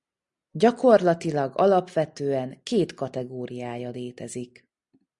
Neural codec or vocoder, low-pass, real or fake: none; 10.8 kHz; real